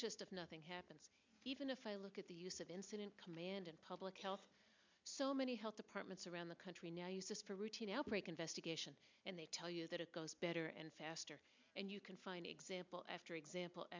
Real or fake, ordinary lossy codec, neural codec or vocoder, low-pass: real; MP3, 64 kbps; none; 7.2 kHz